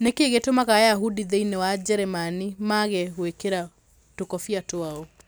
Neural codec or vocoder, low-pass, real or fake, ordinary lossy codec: none; none; real; none